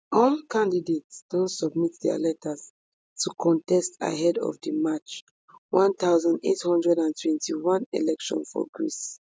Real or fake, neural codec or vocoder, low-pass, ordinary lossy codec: real; none; none; none